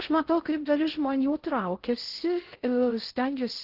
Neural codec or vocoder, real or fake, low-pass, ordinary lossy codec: codec, 16 kHz in and 24 kHz out, 0.6 kbps, FocalCodec, streaming, 2048 codes; fake; 5.4 kHz; Opus, 16 kbps